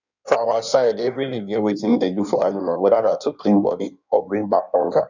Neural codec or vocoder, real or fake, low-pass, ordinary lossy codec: codec, 16 kHz in and 24 kHz out, 1.1 kbps, FireRedTTS-2 codec; fake; 7.2 kHz; none